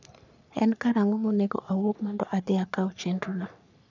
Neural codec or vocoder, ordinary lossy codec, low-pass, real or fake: codec, 44.1 kHz, 3.4 kbps, Pupu-Codec; none; 7.2 kHz; fake